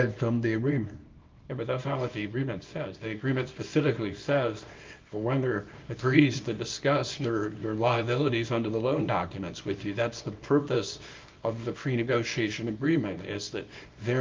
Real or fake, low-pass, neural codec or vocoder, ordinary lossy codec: fake; 7.2 kHz; codec, 24 kHz, 0.9 kbps, WavTokenizer, small release; Opus, 24 kbps